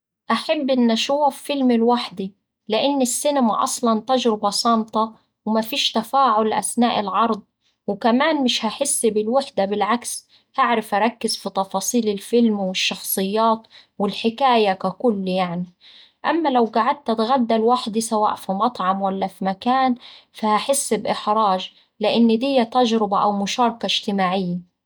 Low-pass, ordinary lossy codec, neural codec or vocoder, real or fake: none; none; none; real